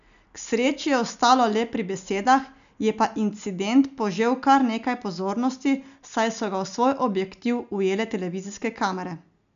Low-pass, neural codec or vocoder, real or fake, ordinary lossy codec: 7.2 kHz; none; real; none